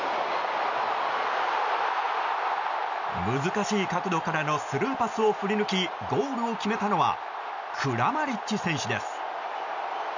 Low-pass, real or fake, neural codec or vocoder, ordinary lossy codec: 7.2 kHz; real; none; none